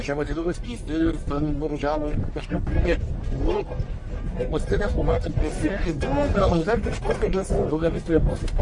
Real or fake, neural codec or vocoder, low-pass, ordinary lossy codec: fake; codec, 44.1 kHz, 1.7 kbps, Pupu-Codec; 10.8 kHz; MP3, 48 kbps